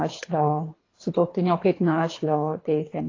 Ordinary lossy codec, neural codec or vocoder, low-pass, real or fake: AAC, 32 kbps; codec, 24 kHz, 3 kbps, HILCodec; 7.2 kHz; fake